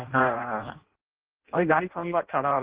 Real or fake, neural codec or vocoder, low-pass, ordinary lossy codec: fake; codec, 16 kHz in and 24 kHz out, 0.6 kbps, FireRedTTS-2 codec; 3.6 kHz; Opus, 16 kbps